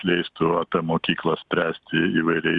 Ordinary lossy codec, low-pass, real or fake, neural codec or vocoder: Opus, 64 kbps; 10.8 kHz; real; none